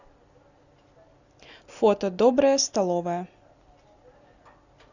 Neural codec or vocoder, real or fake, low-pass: none; real; 7.2 kHz